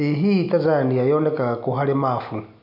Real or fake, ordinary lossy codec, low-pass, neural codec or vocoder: real; none; 5.4 kHz; none